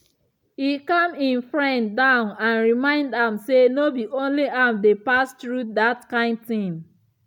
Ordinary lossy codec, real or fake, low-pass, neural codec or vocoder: none; real; 19.8 kHz; none